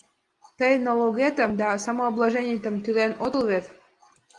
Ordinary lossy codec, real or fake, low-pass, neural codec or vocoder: Opus, 24 kbps; real; 10.8 kHz; none